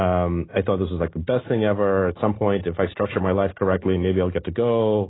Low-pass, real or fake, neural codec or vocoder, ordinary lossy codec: 7.2 kHz; real; none; AAC, 16 kbps